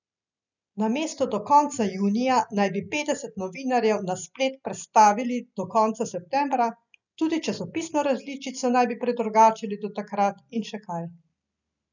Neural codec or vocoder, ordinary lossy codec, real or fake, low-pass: none; none; real; 7.2 kHz